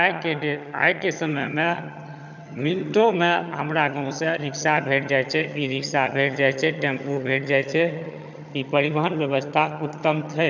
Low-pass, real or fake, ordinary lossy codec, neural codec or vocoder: 7.2 kHz; fake; none; vocoder, 22.05 kHz, 80 mel bands, HiFi-GAN